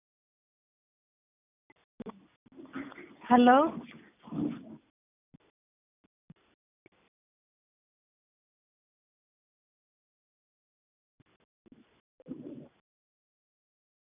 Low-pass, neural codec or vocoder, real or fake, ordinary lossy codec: 3.6 kHz; none; real; none